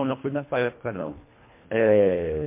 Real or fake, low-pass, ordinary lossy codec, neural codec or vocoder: fake; 3.6 kHz; MP3, 24 kbps; codec, 24 kHz, 1.5 kbps, HILCodec